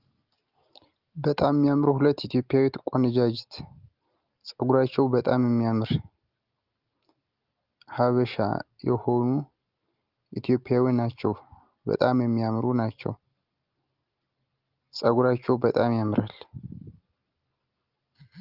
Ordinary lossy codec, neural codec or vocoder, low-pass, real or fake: Opus, 32 kbps; none; 5.4 kHz; real